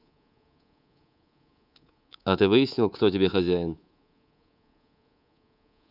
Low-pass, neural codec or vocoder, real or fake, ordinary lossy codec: 5.4 kHz; codec, 24 kHz, 3.1 kbps, DualCodec; fake; none